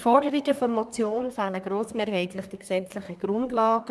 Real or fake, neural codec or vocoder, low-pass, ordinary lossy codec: fake; codec, 24 kHz, 1 kbps, SNAC; none; none